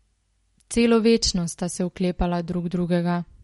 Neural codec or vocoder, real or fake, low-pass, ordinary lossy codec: none; real; 19.8 kHz; MP3, 48 kbps